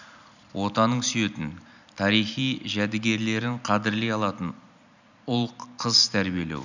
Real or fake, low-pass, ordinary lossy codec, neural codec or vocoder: real; 7.2 kHz; none; none